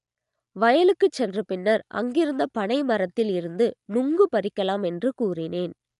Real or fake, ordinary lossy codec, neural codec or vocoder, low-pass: real; none; none; 10.8 kHz